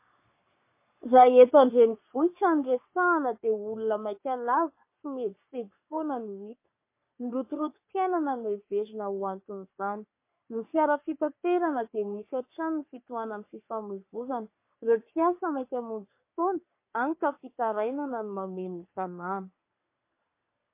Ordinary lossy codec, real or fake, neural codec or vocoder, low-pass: MP3, 24 kbps; fake; codec, 44.1 kHz, 3.4 kbps, Pupu-Codec; 3.6 kHz